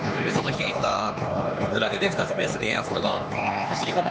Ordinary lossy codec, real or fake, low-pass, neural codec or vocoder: none; fake; none; codec, 16 kHz, 2 kbps, X-Codec, HuBERT features, trained on LibriSpeech